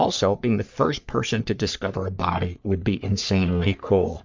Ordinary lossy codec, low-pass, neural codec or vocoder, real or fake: MP3, 64 kbps; 7.2 kHz; codec, 44.1 kHz, 3.4 kbps, Pupu-Codec; fake